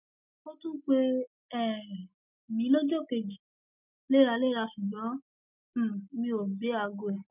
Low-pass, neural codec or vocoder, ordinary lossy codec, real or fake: 3.6 kHz; none; none; real